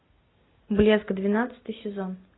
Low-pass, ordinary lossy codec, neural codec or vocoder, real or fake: 7.2 kHz; AAC, 16 kbps; none; real